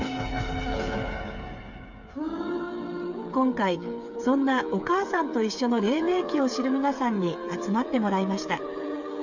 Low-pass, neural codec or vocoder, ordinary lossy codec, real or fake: 7.2 kHz; codec, 16 kHz, 8 kbps, FreqCodec, smaller model; none; fake